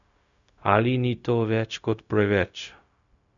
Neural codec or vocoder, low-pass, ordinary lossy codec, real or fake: codec, 16 kHz, 0.4 kbps, LongCat-Audio-Codec; 7.2 kHz; none; fake